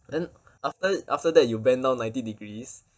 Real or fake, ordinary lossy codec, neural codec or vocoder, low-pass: real; none; none; none